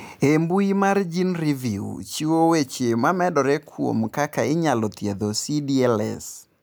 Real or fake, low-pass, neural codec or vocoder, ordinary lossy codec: real; none; none; none